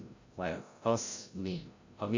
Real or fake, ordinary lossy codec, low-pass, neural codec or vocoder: fake; AAC, 48 kbps; 7.2 kHz; codec, 16 kHz, 0.5 kbps, FreqCodec, larger model